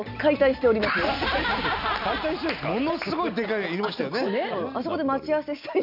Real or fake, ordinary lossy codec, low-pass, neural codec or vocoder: real; none; 5.4 kHz; none